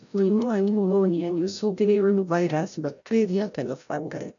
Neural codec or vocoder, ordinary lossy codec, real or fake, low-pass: codec, 16 kHz, 0.5 kbps, FreqCodec, larger model; none; fake; 7.2 kHz